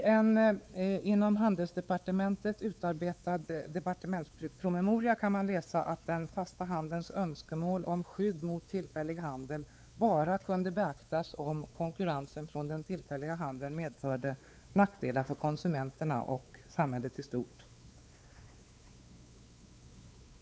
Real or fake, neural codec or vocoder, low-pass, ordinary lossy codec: fake; codec, 16 kHz, 4 kbps, X-Codec, WavLM features, trained on Multilingual LibriSpeech; none; none